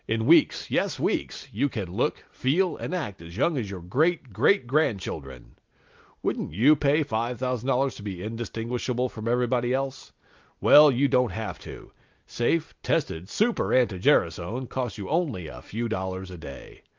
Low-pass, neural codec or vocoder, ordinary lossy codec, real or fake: 7.2 kHz; none; Opus, 24 kbps; real